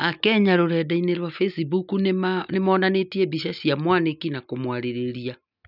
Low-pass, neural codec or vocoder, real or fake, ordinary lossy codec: 5.4 kHz; none; real; none